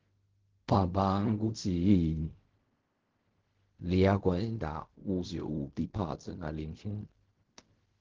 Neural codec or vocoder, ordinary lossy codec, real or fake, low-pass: codec, 16 kHz in and 24 kHz out, 0.4 kbps, LongCat-Audio-Codec, fine tuned four codebook decoder; Opus, 16 kbps; fake; 7.2 kHz